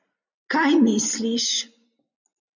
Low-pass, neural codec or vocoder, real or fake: 7.2 kHz; none; real